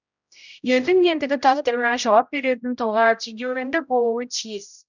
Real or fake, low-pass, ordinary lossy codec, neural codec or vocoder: fake; 7.2 kHz; none; codec, 16 kHz, 0.5 kbps, X-Codec, HuBERT features, trained on general audio